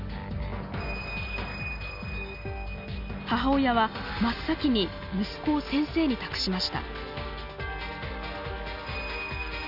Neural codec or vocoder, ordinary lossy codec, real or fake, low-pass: none; none; real; 5.4 kHz